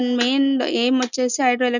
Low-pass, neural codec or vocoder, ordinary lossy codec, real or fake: 7.2 kHz; none; none; real